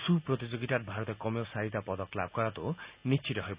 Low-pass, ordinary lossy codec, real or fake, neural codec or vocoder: 3.6 kHz; Opus, 64 kbps; real; none